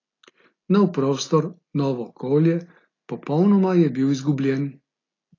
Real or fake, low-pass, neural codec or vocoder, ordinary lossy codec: real; 7.2 kHz; none; AAC, 32 kbps